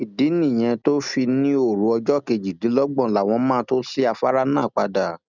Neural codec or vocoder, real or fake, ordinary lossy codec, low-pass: none; real; none; 7.2 kHz